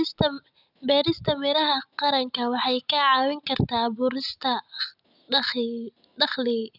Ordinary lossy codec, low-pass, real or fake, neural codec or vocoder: none; 5.4 kHz; real; none